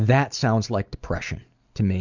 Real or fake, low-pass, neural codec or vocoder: real; 7.2 kHz; none